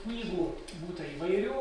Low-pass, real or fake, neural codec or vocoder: 9.9 kHz; fake; vocoder, 44.1 kHz, 128 mel bands every 256 samples, BigVGAN v2